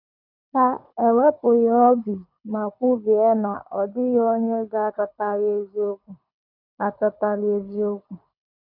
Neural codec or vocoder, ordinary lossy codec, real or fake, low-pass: codec, 24 kHz, 6 kbps, HILCodec; none; fake; 5.4 kHz